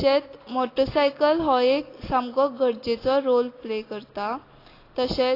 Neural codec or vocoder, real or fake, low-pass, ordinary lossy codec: none; real; 5.4 kHz; AAC, 24 kbps